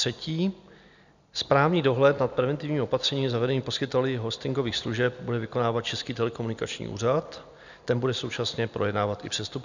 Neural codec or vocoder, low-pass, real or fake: none; 7.2 kHz; real